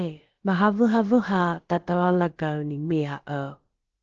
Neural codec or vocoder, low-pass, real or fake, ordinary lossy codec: codec, 16 kHz, about 1 kbps, DyCAST, with the encoder's durations; 7.2 kHz; fake; Opus, 16 kbps